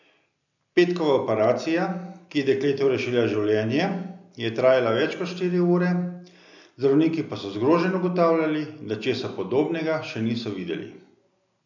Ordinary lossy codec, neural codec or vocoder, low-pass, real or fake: none; none; 7.2 kHz; real